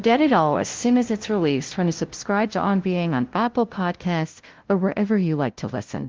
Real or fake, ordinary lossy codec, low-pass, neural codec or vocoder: fake; Opus, 16 kbps; 7.2 kHz; codec, 16 kHz, 0.5 kbps, FunCodec, trained on LibriTTS, 25 frames a second